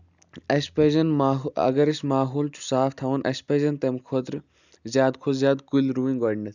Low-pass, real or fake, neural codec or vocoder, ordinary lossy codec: 7.2 kHz; real; none; none